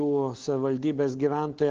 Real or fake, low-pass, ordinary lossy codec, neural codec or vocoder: real; 7.2 kHz; Opus, 16 kbps; none